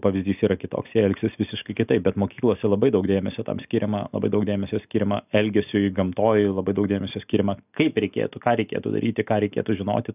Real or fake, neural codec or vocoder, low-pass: real; none; 3.6 kHz